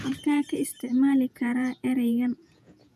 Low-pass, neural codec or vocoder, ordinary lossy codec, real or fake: 14.4 kHz; vocoder, 48 kHz, 128 mel bands, Vocos; none; fake